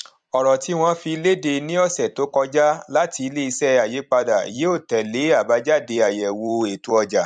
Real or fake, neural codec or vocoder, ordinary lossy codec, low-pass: fake; vocoder, 44.1 kHz, 128 mel bands every 256 samples, BigVGAN v2; MP3, 96 kbps; 9.9 kHz